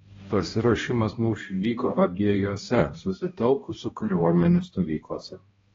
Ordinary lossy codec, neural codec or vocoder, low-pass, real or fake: AAC, 24 kbps; codec, 16 kHz, 1 kbps, X-Codec, HuBERT features, trained on balanced general audio; 7.2 kHz; fake